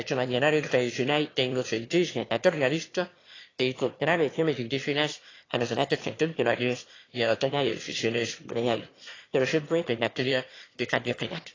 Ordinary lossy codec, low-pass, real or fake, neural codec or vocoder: AAC, 32 kbps; 7.2 kHz; fake; autoencoder, 22.05 kHz, a latent of 192 numbers a frame, VITS, trained on one speaker